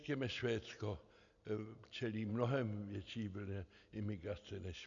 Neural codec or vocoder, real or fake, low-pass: none; real; 7.2 kHz